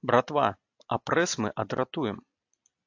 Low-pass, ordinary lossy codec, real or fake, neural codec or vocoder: 7.2 kHz; AAC, 48 kbps; real; none